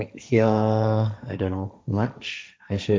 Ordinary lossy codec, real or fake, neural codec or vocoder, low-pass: none; fake; codec, 16 kHz, 1.1 kbps, Voila-Tokenizer; none